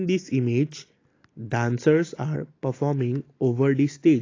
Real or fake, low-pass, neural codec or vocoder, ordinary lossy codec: real; 7.2 kHz; none; AAC, 32 kbps